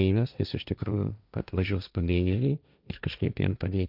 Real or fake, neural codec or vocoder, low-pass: fake; codec, 16 kHz, 1.1 kbps, Voila-Tokenizer; 5.4 kHz